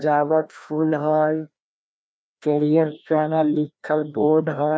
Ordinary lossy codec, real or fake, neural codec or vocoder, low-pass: none; fake; codec, 16 kHz, 1 kbps, FreqCodec, larger model; none